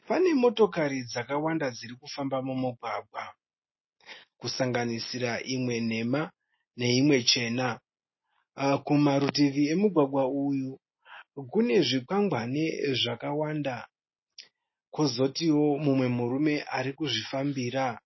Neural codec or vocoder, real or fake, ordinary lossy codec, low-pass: none; real; MP3, 24 kbps; 7.2 kHz